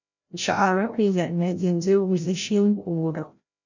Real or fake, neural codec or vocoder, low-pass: fake; codec, 16 kHz, 0.5 kbps, FreqCodec, larger model; 7.2 kHz